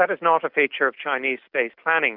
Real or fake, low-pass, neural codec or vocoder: real; 5.4 kHz; none